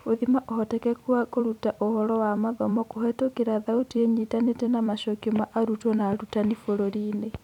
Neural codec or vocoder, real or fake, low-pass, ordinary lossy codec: vocoder, 44.1 kHz, 128 mel bands every 256 samples, BigVGAN v2; fake; 19.8 kHz; none